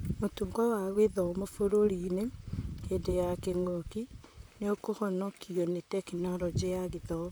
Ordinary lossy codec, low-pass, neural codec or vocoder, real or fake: none; none; vocoder, 44.1 kHz, 128 mel bands, Pupu-Vocoder; fake